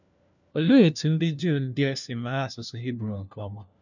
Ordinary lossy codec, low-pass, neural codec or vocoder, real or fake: none; 7.2 kHz; codec, 16 kHz, 1 kbps, FunCodec, trained on LibriTTS, 50 frames a second; fake